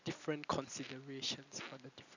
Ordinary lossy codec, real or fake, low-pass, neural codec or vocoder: none; real; 7.2 kHz; none